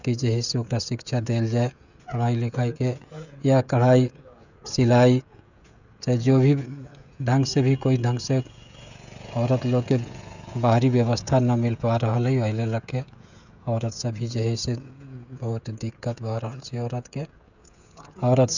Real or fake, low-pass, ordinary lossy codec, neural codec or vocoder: fake; 7.2 kHz; none; codec, 16 kHz, 16 kbps, FreqCodec, smaller model